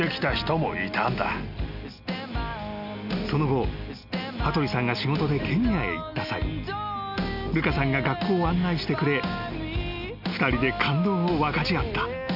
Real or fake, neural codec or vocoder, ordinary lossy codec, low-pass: real; none; none; 5.4 kHz